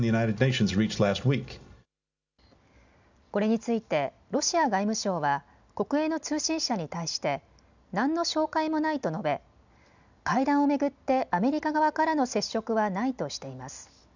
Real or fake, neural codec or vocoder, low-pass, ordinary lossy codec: real; none; 7.2 kHz; none